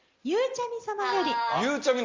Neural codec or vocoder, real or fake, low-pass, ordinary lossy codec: none; real; 7.2 kHz; Opus, 32 kbps